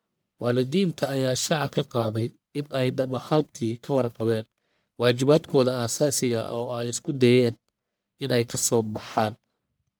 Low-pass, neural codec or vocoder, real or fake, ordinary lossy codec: none; codec, 44.1 kHz, 1.7 kbps, Pupu-Codec; fake; none